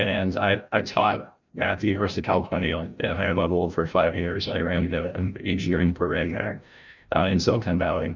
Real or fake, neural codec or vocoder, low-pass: fake; codec, 16 kHz, 0.5 kbps, FreqCodec, larger model; 7.2 kHz